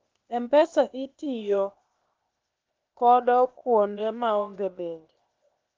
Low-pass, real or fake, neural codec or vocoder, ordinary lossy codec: 7.2 kHz; fake; codec, 16 kHz, 0.8 kbps, ZipCodec; Opus, 24 kbps